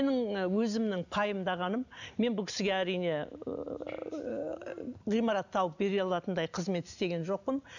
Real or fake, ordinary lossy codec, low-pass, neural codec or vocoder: real; none; 7.2 kHz; none